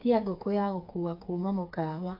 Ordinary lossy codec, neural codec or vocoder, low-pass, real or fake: AAC, 48 kbps; codec, 16 kHz, 4 kbps, FreqCodec, smaller model; 5.4 kHz; fake